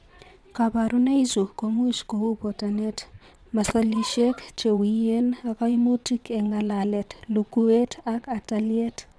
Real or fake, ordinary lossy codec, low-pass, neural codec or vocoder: fake; none; none; vocoder, 22.05 kHz, 80 mel bands, WaveNeXt